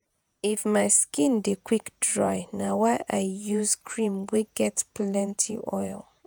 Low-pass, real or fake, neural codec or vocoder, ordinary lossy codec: none; fake; vocoder, 48 kHz, 128 mel bands, Vocos; none